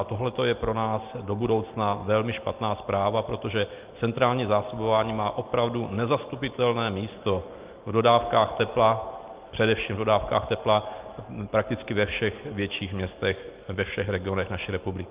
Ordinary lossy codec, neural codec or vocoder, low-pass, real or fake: Opus, 24 kbps; none; 3.6 kHz; real